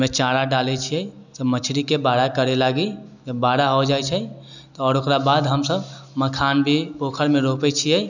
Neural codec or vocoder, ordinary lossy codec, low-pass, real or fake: none; none; 7.2 kHz; real